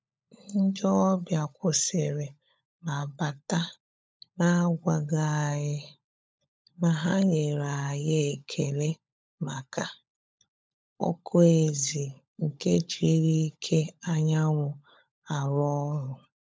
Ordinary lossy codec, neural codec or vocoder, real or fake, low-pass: none; codec, 16 kHz, 16 kbps, FunCodec, trained on LibriTTS, 50 frames a second; fake; none